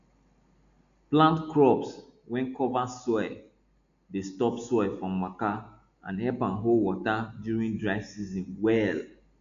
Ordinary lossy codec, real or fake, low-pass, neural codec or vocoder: MP3, 96 kbps; real; 7.2 kHz; none